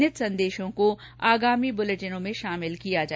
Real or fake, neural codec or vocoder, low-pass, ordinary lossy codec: real; none; none; none